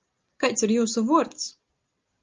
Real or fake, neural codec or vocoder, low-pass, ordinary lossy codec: real; none; 7.2 kHz; Opus, 32 kbps